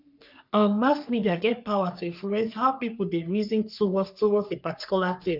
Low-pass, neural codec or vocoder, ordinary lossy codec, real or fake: 5.4 kHz; codec, 44.1 kHz, 3.4 kbps, Pupu-Codec; none; fake